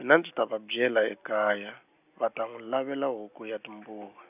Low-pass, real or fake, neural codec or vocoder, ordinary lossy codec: 3.6 kHz; real; none; none